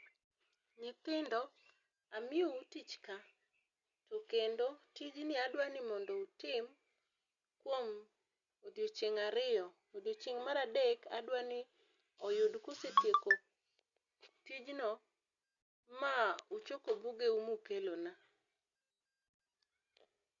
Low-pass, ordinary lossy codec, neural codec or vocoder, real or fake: 7.2 kHz; Opus, 64 kbps; none; real